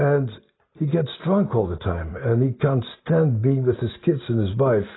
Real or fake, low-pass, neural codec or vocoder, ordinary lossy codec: real; 7.2 kHz; none; AAC, 16 kbps